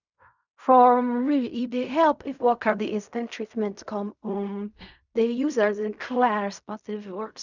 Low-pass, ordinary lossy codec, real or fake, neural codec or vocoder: 7.2 kHz; none; fake; codec, 16 kHz in and 24 kHz out, 0.4 kbps, LongCat-Audio-Codec, fine tuned four codebook decoder